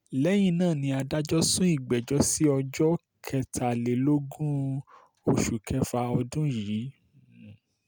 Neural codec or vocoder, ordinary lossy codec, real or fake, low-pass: none; none; real; none